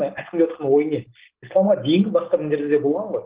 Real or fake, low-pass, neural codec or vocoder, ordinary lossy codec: real; 3.6 kHz; none; Opus, 16 kbps